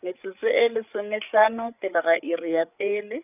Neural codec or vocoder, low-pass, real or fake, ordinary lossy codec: codec, 16 kHz, 8 kbps, FreqCodec, larger model; 3.6 kHz; fake; none